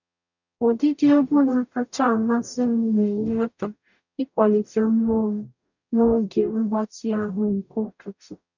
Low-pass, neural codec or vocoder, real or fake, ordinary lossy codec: 7.2 kHz; codec, 44.1 kHz, 0.9 kbps, DAC; fake; none